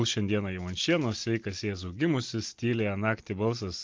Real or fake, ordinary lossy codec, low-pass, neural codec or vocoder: real; Opus, 24 kbps; 7.2 kHz; none